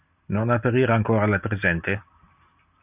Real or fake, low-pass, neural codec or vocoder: real; 3.6 kHz; none